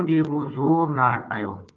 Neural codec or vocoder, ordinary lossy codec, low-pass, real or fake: codec, 16 kHz, 4 kbps, FunCodec, trained on Chinese and English, 50 frames a second; Opus, 32 kbps; 7.2 kHz; fake